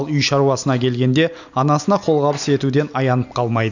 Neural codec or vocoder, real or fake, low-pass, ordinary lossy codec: none; real; 7.2 kHz; none